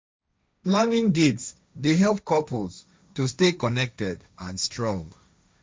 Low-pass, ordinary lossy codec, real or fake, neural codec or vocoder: none; none; fake; codec, 16 kHz, 1.1 kbps, Voila-Tokenizer